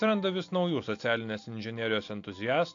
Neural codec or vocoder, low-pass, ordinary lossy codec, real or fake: none; 7.2 kHz; AAC, 48 kbps; real